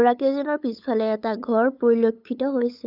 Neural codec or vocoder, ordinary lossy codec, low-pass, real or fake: codec, 16 kHz, 8 kbps, FunCodec, trained on LibriTTS, 25 frames a second; none; 5.4 kHz; fake